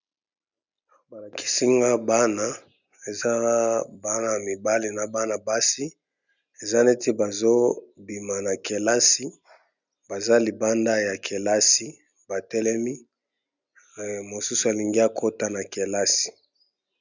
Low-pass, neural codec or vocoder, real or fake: 7.2 kHz; none; real